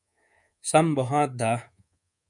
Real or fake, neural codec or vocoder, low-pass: fake; codec, 24 kHz, 3.1 kbps, DualCodec; 10.8 kHz